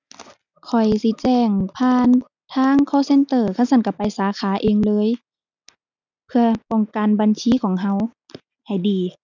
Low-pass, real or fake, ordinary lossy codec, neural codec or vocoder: 7.2 kHz; real; none; none